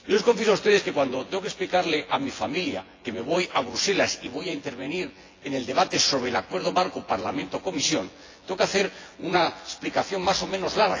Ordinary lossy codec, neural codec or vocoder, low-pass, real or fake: AAC, 32 kbps; vocoder, 24 kHz, 100 mel bands, Vocos; 7.2 kHz; fake